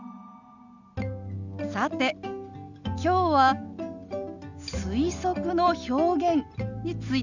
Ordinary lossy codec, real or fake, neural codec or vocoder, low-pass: none; real; none; 7.2 kHz